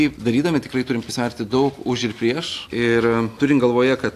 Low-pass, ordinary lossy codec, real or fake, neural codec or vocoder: 14.4 kHz; AAC, 64 kbps; real; none